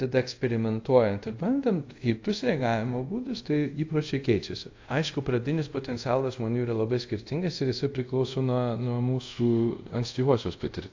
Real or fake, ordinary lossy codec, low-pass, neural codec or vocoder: fake; AAC, 48 kbps; 7.2 kHz; codec, 24 kHz, 0.5 kbps, DualCodec